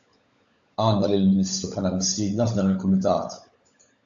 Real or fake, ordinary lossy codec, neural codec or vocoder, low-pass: fake; AAC, 64 kbps; codec, 16 kHz, 16 kbps, FunCodec, trained on LibriTTS, 50 frames a second; 7.2 kHz